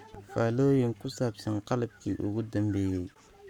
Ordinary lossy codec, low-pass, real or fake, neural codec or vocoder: none; 19.8 kHz; fake; codec, 44.1 kHz, 7.8 kbps, Pupu-Codec